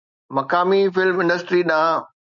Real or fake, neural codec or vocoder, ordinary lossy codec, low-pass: real; none; MP3, 96 kbps; 7.2 kHz